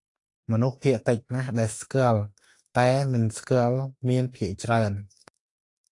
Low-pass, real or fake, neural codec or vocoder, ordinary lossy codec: 10.8 kHz; fake; autoencoder, 48 kHz, 32 numbers a frame, DAC-VAE, trained on Japanese speech; AAC, 48 kbps